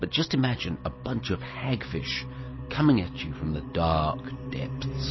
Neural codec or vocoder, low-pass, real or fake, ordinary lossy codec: none; 7.2 kHz; real; MP3, 24 kbps